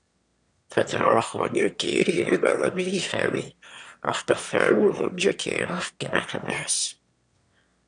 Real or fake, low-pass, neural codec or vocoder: fake; 9.9 kHz; autoencoder, 22.05 kHz, a latent of 192 numbers a frame, VITS, trained on one speaker